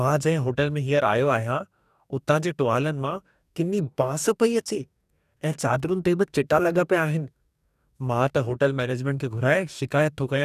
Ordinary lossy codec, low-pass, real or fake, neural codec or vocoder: none; 14.4 kHz; fake; codec, 44.1 kHz, 2.6 kbps, DAC